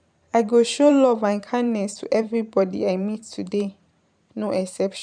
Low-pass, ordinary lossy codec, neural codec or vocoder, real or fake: 9.9 kHz; none; vocoder, 44.1 kHz, 128 mel bands every 512 samples, BigVGAN v2; fake